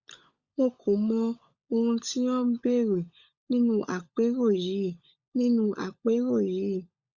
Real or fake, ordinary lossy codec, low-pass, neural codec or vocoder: fake; Opus, 64 kbps; 7.2 kHz; codec, 16 kHz, 16 kbps, FunCodec, trained on LibriTTS, 50 frames a second